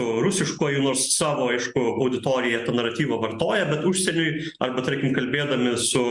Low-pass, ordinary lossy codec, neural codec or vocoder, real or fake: 10.8 kHz; Opus, 64 kbps; none; real